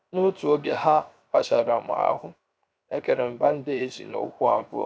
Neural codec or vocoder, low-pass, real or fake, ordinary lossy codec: codec, 16 kHz, 0.7 kbps, FocalCodec; none; fake; none